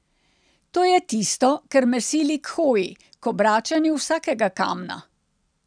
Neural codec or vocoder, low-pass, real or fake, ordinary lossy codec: none; 9.9 kHz; real; none